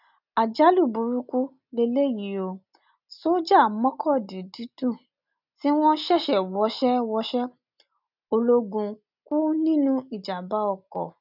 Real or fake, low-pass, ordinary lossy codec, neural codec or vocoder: real; 5.4 kHz; none; none